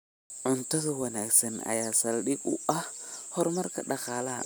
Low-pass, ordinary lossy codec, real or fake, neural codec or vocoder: none; none; real; none